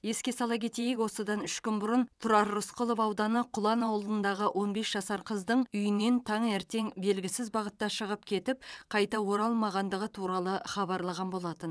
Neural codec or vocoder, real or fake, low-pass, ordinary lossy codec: vocoder, 22.05 kHz, 80 mel bands, WaveNeXt; fake; none; none